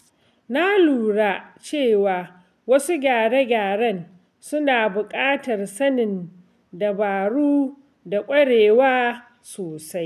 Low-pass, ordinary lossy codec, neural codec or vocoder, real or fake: 14.4 kHz; none; none; real